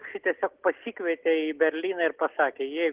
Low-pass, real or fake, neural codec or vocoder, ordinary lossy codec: 3.6 kHz; real; none; Opus, 16 kbps